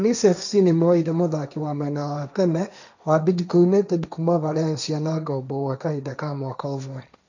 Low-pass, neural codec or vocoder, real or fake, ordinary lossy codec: 7.2 kHz; codec, 16 kHz, 1.1 kbps, Voila-Tokenizer; fake; none